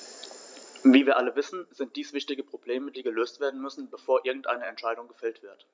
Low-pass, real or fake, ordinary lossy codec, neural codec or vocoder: none; real; none; none